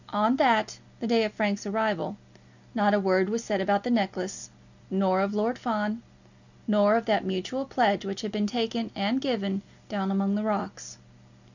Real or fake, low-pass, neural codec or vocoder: real; 7.2 kHz; none